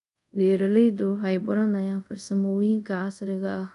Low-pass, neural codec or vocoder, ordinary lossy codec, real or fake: 10.8 kHz; codec, 24 kHz, 0.5 kbps, DualCodec; none; fake